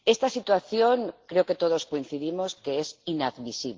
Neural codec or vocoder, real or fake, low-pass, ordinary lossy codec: none; real; 7.2 kHz; Opus, 16 kbps